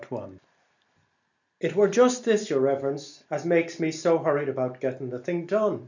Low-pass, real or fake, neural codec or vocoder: 7.2 kHz; real; none